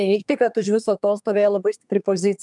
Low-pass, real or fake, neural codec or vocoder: 10.8 kHz; fake; codec, 24 kHz, 1 kbps, SNAC